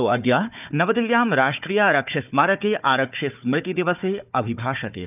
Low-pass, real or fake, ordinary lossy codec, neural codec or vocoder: 3.6 kHz; fake; none; codec, 16 kHz, 4 kbps, FunCodec, trained on Chinese and English, 50 frames a second